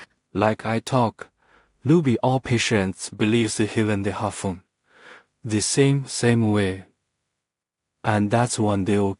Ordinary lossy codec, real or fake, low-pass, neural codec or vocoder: AAC, 48 kbps; fake; 10.8 kHz; codec, 16 kHz in and 24 kHz out, 0.4 kbps, LongCat-Audio-Codec, two codebook decoder